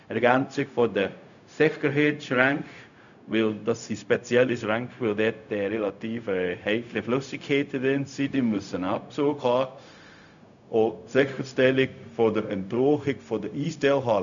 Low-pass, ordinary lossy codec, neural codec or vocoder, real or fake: 7.2 kHz; none; codec, 16 kHz, 0.4 kbps, LongCat-Audio-Codec; fake